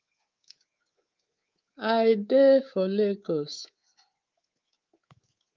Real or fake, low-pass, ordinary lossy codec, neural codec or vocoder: fake; 7.2 kHz; Opus, 32 kbps; codec, 24 kHz, 3.1 kbps, DualCodec